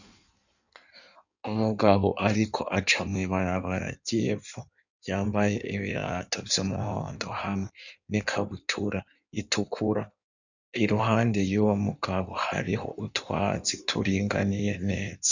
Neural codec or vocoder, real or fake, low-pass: codec, 16 kHz in and 24 kHz out, 1.1 kbps, FireRedTTS-2 codec; fake; 7.2 kHz